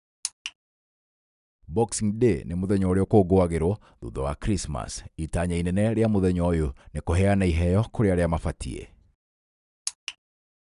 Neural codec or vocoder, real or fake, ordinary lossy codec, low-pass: none; real; none; 10.8 kHz